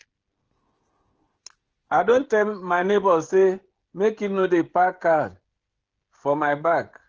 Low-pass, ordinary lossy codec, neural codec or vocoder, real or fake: 7.2 kHz; Opus, 16 kbps; codec, 16 kHz, 16 kbps, FreqCodec, smaller model; fake